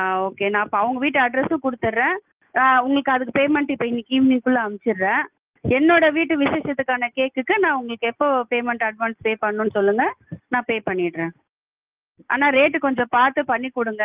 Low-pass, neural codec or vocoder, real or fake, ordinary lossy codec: 3.6 kHz; none; real; Opus, 32 kbps